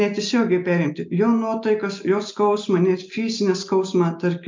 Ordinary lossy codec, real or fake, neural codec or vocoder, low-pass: MP3, 64 kbps; real; none; 7.2 kHz